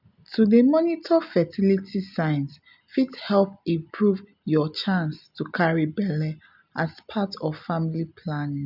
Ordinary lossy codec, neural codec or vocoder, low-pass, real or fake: none; none; 5.4 kHz; real